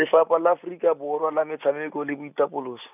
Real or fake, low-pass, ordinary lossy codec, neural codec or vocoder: real; 3.6 kHz; none; none